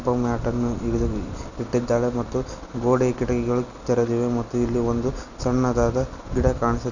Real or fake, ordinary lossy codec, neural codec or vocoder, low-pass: real; none; none; 7.2 kHz